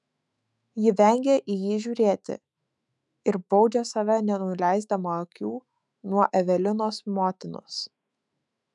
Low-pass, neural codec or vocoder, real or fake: 10.8 kHz; autoencoder, 48 kHz, 128 numbers a frame, DAC-VAE, trained on Japanese speech; fake